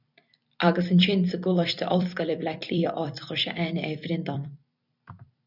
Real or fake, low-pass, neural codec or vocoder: fake; 5.4 kHz; vocoder, 44.1 kHz, 128 mel bands every 256 samples, BigVGAN v2